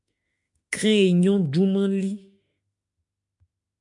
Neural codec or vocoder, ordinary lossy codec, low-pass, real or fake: autoencoder, 48 kHz, 32 numbers a frame, DAC-VAE, trained on Japanese speech; MP3, 64 kbps; 10.8 kHz; fake